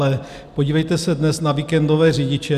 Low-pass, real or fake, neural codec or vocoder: 14.4 kHz; real; none